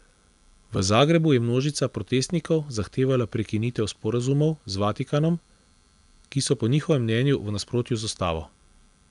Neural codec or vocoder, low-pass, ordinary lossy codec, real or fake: none; 10.8 kHz; none; real